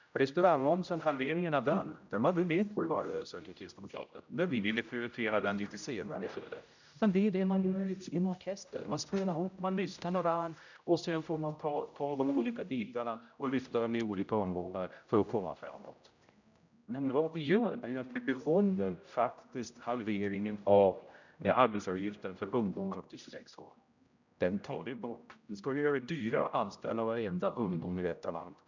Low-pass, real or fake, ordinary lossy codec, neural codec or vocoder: 7.2 kHz; fake; none; codec, 16 kHz, 0.5 kbps, X-Codec, HuBERT features, trained on general audio